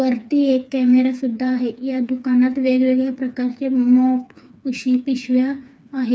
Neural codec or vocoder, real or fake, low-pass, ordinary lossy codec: codec, 16 kHz, 4 kbps, FreqCodec, smaller model; fake; none; none